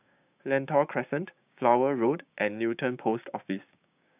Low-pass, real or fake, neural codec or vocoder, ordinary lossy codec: 3.6 kHz; fake; codec, 16 kHz, 6 kbps, DAC; none